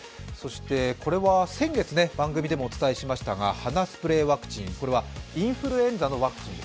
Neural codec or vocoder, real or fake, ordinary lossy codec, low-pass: none; real; none; none